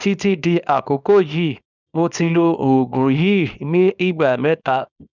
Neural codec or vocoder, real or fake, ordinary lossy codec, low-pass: codec, 24 kHz, 0.9 kbps, WavTokenizer, small release; fake; none; 7.2 kHz